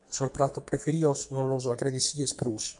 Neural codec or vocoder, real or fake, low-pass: codec, 44.1 kHz, 2.6 kbps, SNAC; fake; 10.8 kHz